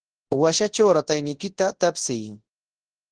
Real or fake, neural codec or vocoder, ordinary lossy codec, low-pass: fake; codec, 24 kHz, 0.9 kbps, WavTokenizer, large speech release; Opus, 16 kbps; 9.9 kHz